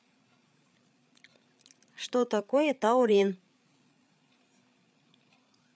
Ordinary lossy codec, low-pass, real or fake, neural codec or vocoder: none; none; fake; codec, 16 kHz, 8 kbps, FreqCodec, larger model